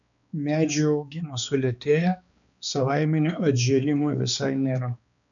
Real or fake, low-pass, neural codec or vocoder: fake; 7.2 kHz; codec, 16 kHz, 2 kbps, X-Codec, HuBERT features, trained on balanced general audio